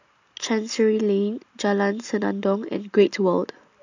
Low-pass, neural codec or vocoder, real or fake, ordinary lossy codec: 7.2 kHz; none; real; AAC, 48 kbps